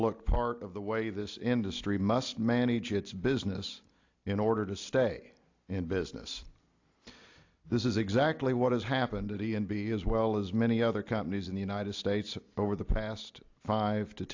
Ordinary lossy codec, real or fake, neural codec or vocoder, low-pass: AAC, 48 kbps; real; none; 7.2 kHz